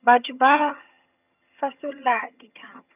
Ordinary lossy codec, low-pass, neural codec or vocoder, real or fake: none; 3.6 kHz; vocoder, 22.05 kHz, 80 mel bands, HiFi-GAN; fake